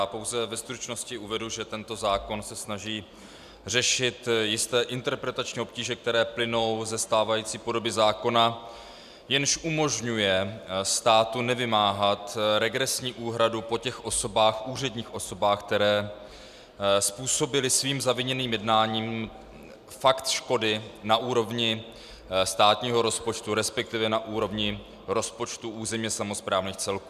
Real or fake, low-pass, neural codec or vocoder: real; 14.4 kHz; none